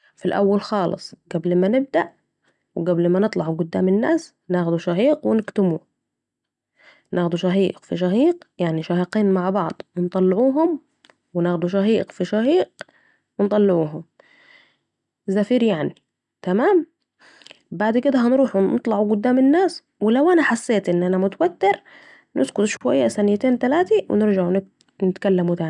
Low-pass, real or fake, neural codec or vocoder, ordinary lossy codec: 10.8 kHz; real; none; none